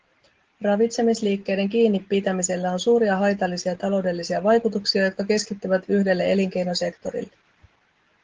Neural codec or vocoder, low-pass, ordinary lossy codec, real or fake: none; 7.2 kHz; Opus, 16 kbps; real